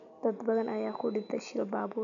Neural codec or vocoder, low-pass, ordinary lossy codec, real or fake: none; 7.2 kHz; none; real